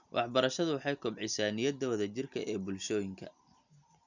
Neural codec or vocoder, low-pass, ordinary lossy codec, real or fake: none; 7.2 kHz; none; real